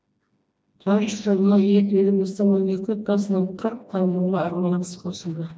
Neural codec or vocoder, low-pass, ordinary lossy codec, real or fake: codec, 16 kHz, 1 kbps, FreqCodec, smaller model; none; none; fake